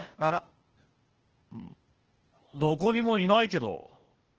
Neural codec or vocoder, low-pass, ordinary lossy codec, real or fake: codec, 16 kHz, 0.8 kbps, ZipCodec; 7.2 kHz; Opus, 16 kbps; fake